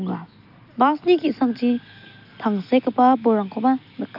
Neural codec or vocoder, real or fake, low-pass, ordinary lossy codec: none; real; 5.4 kHz; AAC, 48 kbps